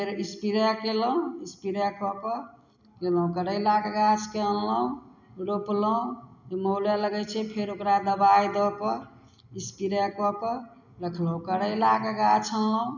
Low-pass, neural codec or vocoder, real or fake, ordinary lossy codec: 7.2 kHz; none; real; none